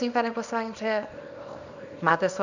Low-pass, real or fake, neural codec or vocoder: 7.2 kHz; fake; codec, 24 kHz, 0.9 kbps, WavTokenizer, small release